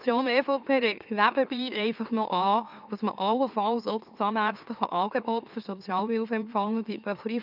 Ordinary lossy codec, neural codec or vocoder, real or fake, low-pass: none; autoencoder, 44.1 kHz, a latent of 192 numbers a frame, MeloTTS; fake; 5.4 kHz